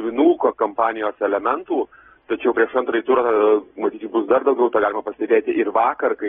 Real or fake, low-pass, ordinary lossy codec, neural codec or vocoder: real; 19.8 kHz; AAC, 16 kbps; none